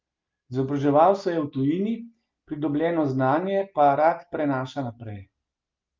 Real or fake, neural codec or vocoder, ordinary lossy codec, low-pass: real; none; Opus, 24 kbps; 7.2 kHz